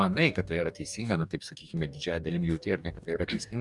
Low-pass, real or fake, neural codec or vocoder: 10.8 kHz; fake; codec, 44.1 kHz, 2.6 kbps, DAC